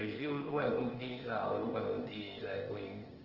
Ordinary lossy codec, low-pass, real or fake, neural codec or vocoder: Opus, 16 kbps; 5.4 kHz; fake; codec, 16 kHz, 0.8 kbps, ZipCodec